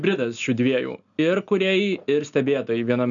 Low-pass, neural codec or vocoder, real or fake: 7.2 kHz; none; real